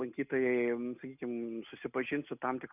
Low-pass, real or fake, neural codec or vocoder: 3.6 kHz; real; none